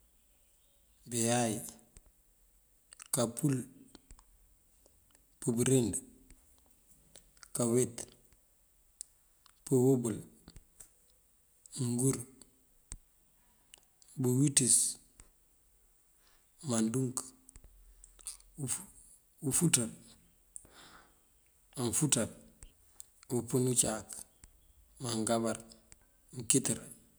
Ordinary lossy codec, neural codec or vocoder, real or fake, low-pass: none; none; real; none